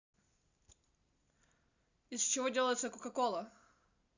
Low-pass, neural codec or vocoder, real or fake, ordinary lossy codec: 7.2 kHz; none; real; Opus, 64 kbps